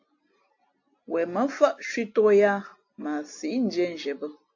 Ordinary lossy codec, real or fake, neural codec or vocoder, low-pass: AAC, 48 kbps; real; none; 7.2 kHz